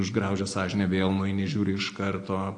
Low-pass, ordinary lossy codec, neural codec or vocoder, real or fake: 9.9 kHz; AAC, 48 kbps; none; real